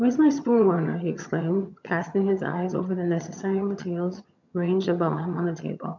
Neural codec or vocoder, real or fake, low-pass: vocoder, 22.05 kHz, 80 mel bands, HiFi-GAN; fake; 7.2 kHz